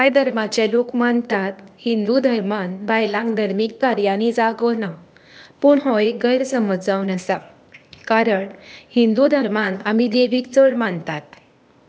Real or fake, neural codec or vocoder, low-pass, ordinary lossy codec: fake; codec, 16 kHz, 0.8 kbps, ZipCodec; none; none